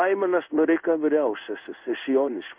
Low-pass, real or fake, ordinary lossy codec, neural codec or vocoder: 3.6 kHz; fake; Opus, 64 kbps; codec, 16 kHz in and 24 kHz out, 1 kbps, XY-Tokenizer